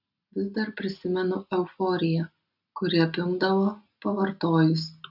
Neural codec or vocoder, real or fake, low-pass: none; real; 5.4 kHz